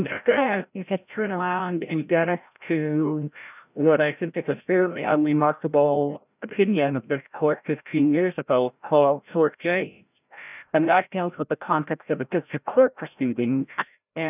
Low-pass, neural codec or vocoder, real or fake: 3.6 kHz; codec, 16 kHz, 0.5 kbps, FreqCodec, larger model; fake